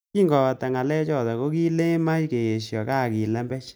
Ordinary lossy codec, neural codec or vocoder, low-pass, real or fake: none; none; none; real